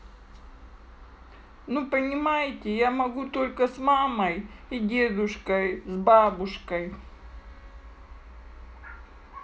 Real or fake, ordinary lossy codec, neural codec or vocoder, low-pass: real; none; none; none